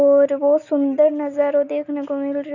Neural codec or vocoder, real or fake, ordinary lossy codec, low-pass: none; real; none; 7.2 kHz